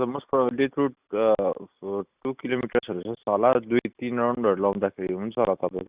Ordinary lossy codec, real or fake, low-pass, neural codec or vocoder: Opus, 64 kbps; real; 3.6 kHz; none